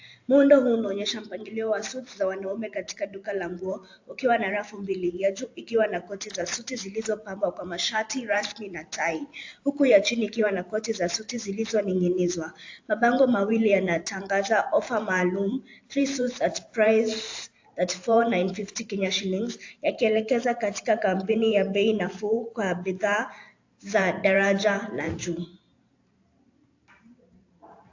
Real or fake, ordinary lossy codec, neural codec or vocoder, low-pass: fake; AAC, 48 kbps; vocoder, 44.1 kHz, 128 mel bands every 512 samples, BigVGAN v2; 7.2 kHz